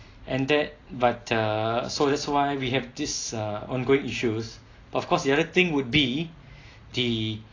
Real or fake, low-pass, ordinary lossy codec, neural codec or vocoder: real; 7.2 kHz; AAC, 32 kbps; none